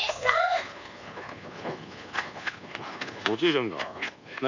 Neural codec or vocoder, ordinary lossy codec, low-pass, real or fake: codec, 24 kHz, 1.2 kbps, DualCodec; none; 7.2 kHz; fake